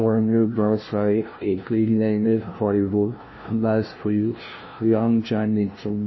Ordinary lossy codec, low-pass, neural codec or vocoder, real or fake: MP3, 24 kbps; 7.2 kHz; codec, 16 kHz, 0.5 kbps, FunCodec, trained on LibriTTS, 25 frames a second; fake